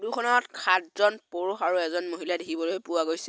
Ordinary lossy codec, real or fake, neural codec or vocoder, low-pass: none; real; none; none